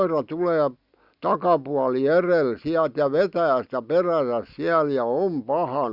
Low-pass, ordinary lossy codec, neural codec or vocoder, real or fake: 5.4 kHz; Opus, 64 kbps; none; real